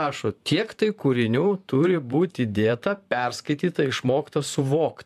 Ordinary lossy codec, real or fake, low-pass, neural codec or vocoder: MP3, 96 kbps; fake; 14.4 kHz; vocoder, 44.1 kHz, 128 mel bands, Pupu-Vocoder